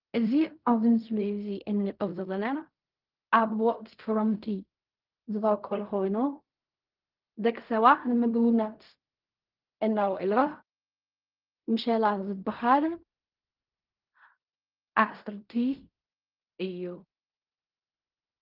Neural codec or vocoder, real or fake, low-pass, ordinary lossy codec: codec, 16 kHz in and 24 kHz out, 0.4 kbps, LongCat-Audio-Codec, fine tuned four codebook decoder; fake; 5.4 kHz; Opus, 32 kbps